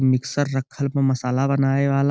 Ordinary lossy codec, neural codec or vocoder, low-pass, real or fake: none; none; none; real